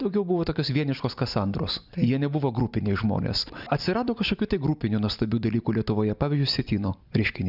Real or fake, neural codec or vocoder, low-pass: real; none; 5.4 kHz